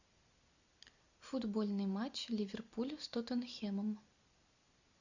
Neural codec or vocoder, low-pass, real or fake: none; 7.2 kHz; real